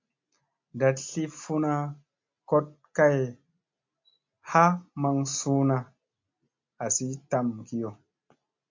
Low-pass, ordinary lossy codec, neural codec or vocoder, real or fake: 7.2 kHz; MP3, 64 kbps; none; real